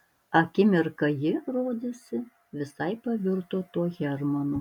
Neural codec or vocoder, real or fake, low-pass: none; real; 19.8 kHz